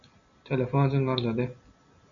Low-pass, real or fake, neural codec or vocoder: 7.2 kHz; real; none